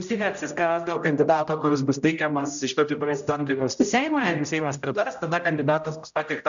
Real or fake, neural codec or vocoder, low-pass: fake; codec, 16 kHz, 0.5 kbps, X-Codec, HuBERT features, trained on general audio; 7.2 kHz